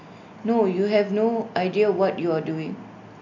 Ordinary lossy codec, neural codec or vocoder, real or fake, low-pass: none; none; real; 7.2 kHz